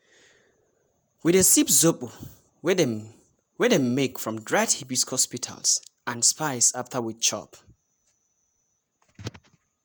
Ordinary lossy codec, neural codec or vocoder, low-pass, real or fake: none; vocoder, 48 kHz, 128 mel bands, Vocos; none; fake